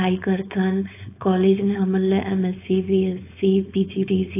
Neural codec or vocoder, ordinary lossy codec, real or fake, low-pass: codec, 16 kHz, 4.8 kbps, FACodec; AAC, 24 kbps; fake; 3.6 kHz